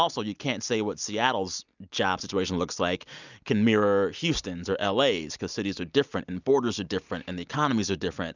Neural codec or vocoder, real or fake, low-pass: none; real; 7.2 kHz